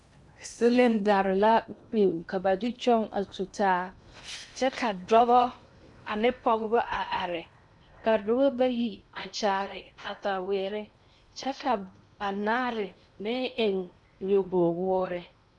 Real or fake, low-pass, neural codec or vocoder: fake; 10.8 kHz; codec, 16 kHz in and 24 kHz out, 0.8 kbps, FocalCodec, streaming, 65536 codes